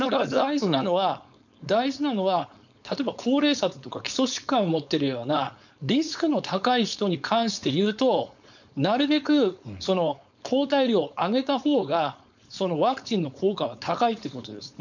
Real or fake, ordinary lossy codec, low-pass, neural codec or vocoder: fake; none; 7.2 kHz; codec, 16 kHz, 4.8 kbps, FACodec